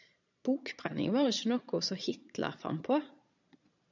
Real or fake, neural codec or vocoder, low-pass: fake; vocoder, 22.05 kHz, 80 mel bands, Vocos; 7.2 kHz